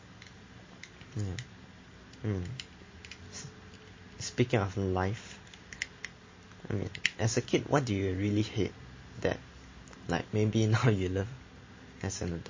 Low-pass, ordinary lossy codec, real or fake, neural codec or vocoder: 7.2 kHz; MP3, 32 kbps; real; none